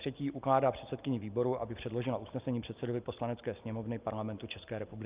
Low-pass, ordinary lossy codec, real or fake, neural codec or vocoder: 3.6 kHz; Opus, 24 kbps; real; none